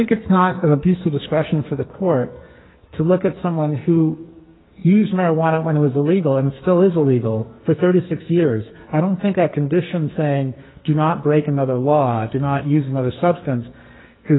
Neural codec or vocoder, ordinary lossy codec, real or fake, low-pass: codec, 44.1 kHz, 2.6 kbps, SNAC; AAC, 16 kbps; fake; 7.2 kHz